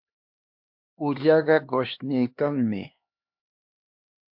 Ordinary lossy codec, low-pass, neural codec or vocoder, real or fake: MP3, 48 kbps; 5.4 kHz; codec, 16 kHz, 2 kbps, X-Codec, HuBERT features, trained on LibriSpeech; fake